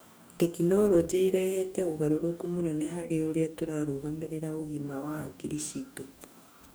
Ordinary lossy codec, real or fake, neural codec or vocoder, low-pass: none; fake; codec, 44.1 kHz, 2.6 kbps, DAC; none